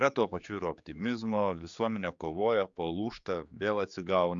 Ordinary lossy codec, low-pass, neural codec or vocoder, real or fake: Opus, 64 kbps; 7.2 kHz; codec, 16 kHz, 4 kbps, X-Codec, HuBERT features, trained on general audio; fake